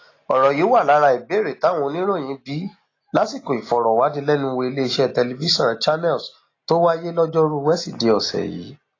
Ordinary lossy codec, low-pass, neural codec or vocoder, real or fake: AAC, 32 kbps; 7.2 kHz; none; real